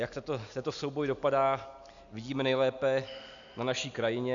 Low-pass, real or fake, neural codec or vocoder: 7.2 kHz; real; none